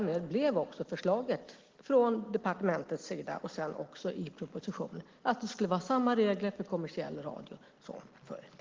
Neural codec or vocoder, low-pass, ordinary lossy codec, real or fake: none; 7.2 kHz; Opus, 16 kbps; real